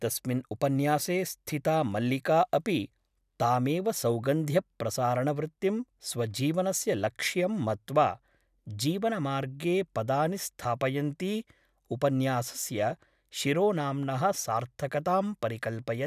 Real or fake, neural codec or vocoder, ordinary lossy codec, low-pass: real; none; none; 14.4 kHz